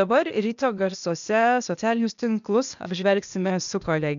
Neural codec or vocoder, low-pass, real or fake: codec, 16 kHz, 0.8 kbps, ZipCodec; 7.2 kHz; fake